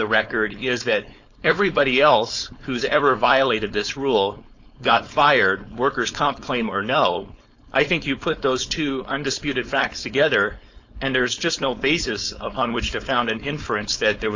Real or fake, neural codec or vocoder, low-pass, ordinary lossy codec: fake; codec, 16 kHz, 4.8 kbps, FACodec; 7.2 kHz; AAC, 48 kbps